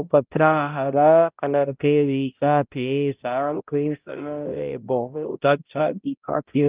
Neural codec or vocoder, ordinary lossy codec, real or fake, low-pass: codec, 16 kHz, 0.5 kbps, X-Codec, HuBERT features, trained on balanced general audio; Opus, 24 kbps; fake; 3.6 kHz